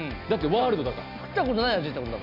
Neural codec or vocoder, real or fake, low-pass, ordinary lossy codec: none; real; 5.4 kHz; none